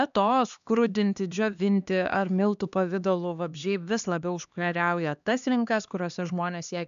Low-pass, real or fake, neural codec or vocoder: 7.2 kHz; fake; codec, 16 kHz, 2 kbps, X-Codec, HuBERT features, trained on LibriSpeech